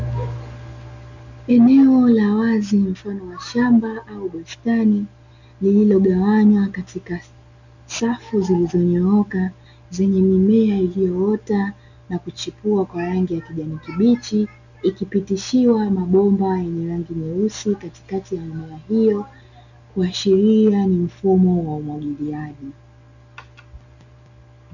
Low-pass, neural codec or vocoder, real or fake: 7.2 kHz; none; real